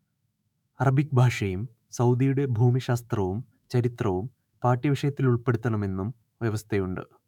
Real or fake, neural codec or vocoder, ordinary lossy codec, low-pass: fake; autoencoder, 48 kHz, 128 numbers a frame, DAC-VAE, trained on Japanese speech; none; 19.8 kHz